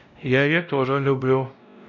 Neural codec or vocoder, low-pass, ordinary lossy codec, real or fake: codec, 16 kHz, 0.5 kbps, X-Codec, WavLM features, trained on Multilingual LibriSpeech; 7.2 kHz; none; fake